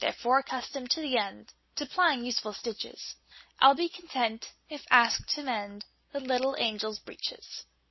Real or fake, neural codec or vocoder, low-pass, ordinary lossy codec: real; none; 7.2 kHz; MP3, 24 kbps